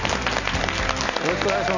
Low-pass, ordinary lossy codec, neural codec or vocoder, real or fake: 7.2 kHz; none; none; real